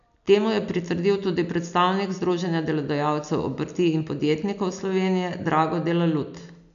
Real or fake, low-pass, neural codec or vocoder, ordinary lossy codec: real; 7.2 kHz; none; none